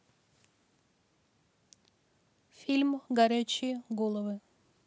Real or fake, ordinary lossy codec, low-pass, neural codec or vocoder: real; none; none; none